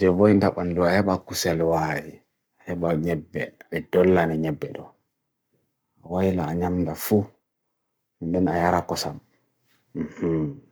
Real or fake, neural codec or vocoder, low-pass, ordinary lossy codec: fake; codec, 44.1 kHz, 7.8 kbps, Pupu-Codec; none; none